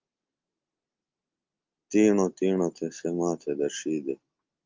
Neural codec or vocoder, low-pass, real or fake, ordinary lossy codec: none; 7.2 kHz; real; Opus, 32 kbps